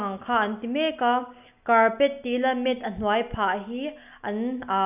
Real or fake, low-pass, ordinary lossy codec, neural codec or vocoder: real; 3.6 kHz; none; none